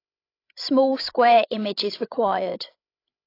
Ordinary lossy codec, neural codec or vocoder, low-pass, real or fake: AAC, 32 kbps; codec, 16 kHz, 16 kbps, FreqCodec, larger model; 5.4 kHz; fake